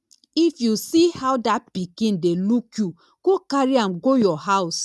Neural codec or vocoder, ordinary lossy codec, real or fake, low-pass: none; none; real; none